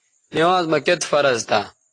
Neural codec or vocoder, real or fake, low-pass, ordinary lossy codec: none; real; 9.9 kHz; AAC, 32 kbps